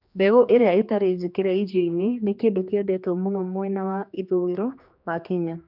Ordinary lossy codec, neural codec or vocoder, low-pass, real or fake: none; codec, 16 kHz, 2 kbps, X-Codec, HuBERT features, trained on general audio; 5.4 kHz; fake